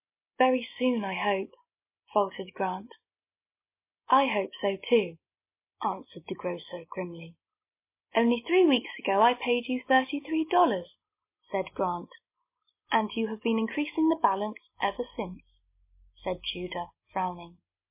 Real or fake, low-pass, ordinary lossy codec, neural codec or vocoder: real; 3.6 kHz; MP3, 24 kbps; none